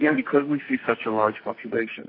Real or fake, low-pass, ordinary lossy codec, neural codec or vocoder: fake; 5.4 kHz; AAC, 24 kbps; codec, 44.1 kHz, 2.6 kbps, SNAC